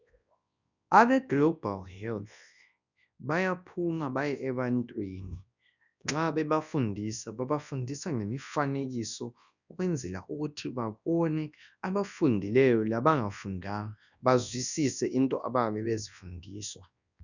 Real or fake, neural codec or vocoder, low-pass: fake; codec, 24 kHz, 0.9 kbps, WavTokenizer, large speech release; 7.2 kHz